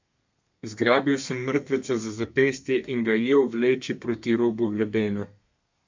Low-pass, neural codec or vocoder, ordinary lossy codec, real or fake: 7.2 kHz; codec, 32 kHz, 1.9 kbps, SNAC; MP3, 64 kbps; fake